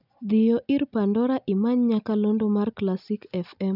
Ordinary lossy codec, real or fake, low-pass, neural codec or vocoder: none; real; 5.4 kHz; none